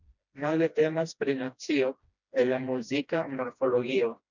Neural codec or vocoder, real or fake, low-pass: codec, 16 kHz, 1 kbps, FreqCodec, smaller model; fake; 7.2 kHz